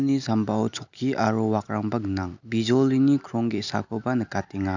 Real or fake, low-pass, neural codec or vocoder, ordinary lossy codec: real; 7.2 kHz; none; none